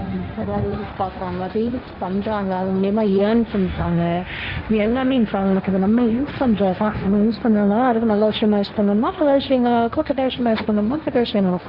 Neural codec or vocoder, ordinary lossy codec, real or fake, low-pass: codec, 16 kHz, 1.1 kbps, Voila-Tokenizer; Opus, 64 kbps; fake; 5.4 kHz